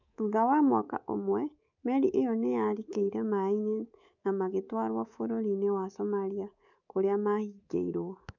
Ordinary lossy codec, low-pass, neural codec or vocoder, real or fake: none; 7.2 kHz; codec, 24 kHz, 3.1 kbps, DualCodec; fake